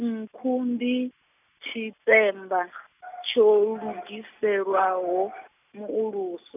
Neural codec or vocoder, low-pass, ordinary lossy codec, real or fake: none; 3.6 kHz; none; real